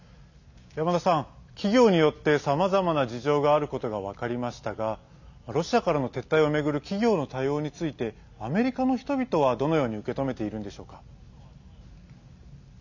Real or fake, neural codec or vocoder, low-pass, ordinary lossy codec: real; none; 7.2 kHz; none